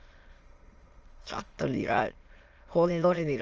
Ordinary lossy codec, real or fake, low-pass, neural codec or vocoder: Opus, 24 kbps; fake; 7.2 kHz; autoencoder, 22.05 kHz, a latent of 192 numbers a frame, VITS, trained on many speakers